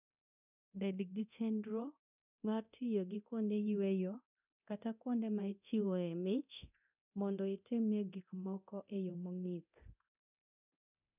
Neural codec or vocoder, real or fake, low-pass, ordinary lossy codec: codec, 24 kHz, 0.9 kbps, DualCodec; fake; 3.6 kHz; none